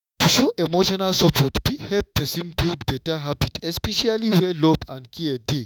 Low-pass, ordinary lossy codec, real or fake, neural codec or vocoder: 19.8 kHz; none; fake; autoencoder, 48 kHz, 32 numbers a frame, DAC-VAE, trained on Japanese speech